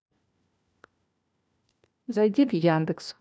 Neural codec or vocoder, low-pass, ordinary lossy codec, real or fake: codec, 16 kHz, 1 kbps, FunCodec, trained on LibriTTS, 50 frames a second; none; none; fake